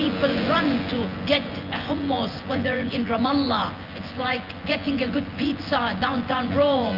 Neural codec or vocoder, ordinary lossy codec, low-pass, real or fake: vocoder, 24 kHz, 100 mel bands, Vocos; Opus, 32 kbps; 5.4 kHz; fake